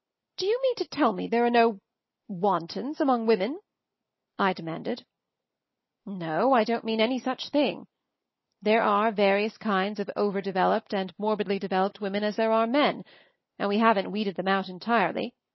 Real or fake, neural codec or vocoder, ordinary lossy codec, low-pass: real; none; MP3, 24 kbps; 7.2 kHz